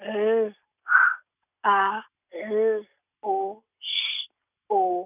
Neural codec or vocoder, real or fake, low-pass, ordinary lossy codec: none; real; 3.6 kHz; none